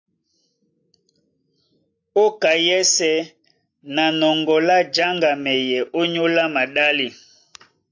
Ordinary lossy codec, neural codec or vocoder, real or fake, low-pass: AAC, 48 kbps; none; real; 7.2 kHz